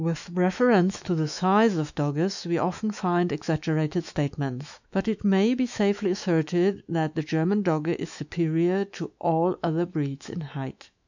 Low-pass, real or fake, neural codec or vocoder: 7.2 kHz; fake; autoencoder, 48 kHz, 128 numbers a frame, DAC-VAE, trained on Japanese speech